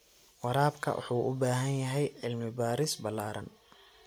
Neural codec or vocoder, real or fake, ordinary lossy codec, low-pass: none; real; none; none